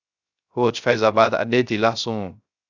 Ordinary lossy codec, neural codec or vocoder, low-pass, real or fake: Opus, 64 kbps; codec, 16 kHz, 0.3 kbps, FocalCodec; 7.2 kHz; fake